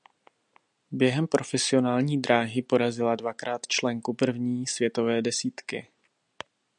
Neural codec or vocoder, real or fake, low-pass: none; real; 9.9 kHz